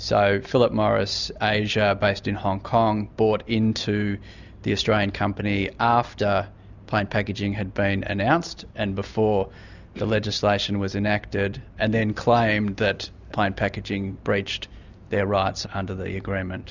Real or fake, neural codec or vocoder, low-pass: real; none; 7.2 kHz